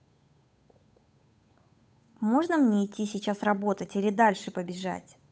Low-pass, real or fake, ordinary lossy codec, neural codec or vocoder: none; fake; none; codec, 16 kHz, 8 kbps, FunCodec, trained on Chinese and English, 25 frames a second